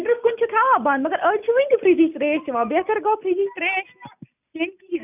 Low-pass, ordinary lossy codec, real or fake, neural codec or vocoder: 3.6 kHz; none; real; none